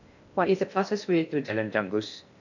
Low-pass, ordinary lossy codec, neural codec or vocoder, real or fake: 7.2 kHz; none; codec, 16 kHz in and 24 kHz out, 0.6 kbps, FocalCodec, streaming, 2048 codes; fake